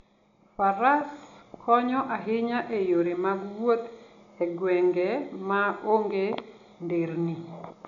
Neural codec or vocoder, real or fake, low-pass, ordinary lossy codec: none; real; 7.2 kHz; none